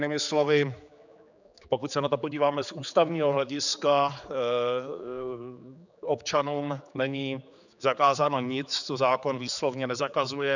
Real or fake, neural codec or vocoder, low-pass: fake; codec, 16 kHz, 4 kbps, X-Codec, HuBERT features, trained on general audio; 7.2 kHz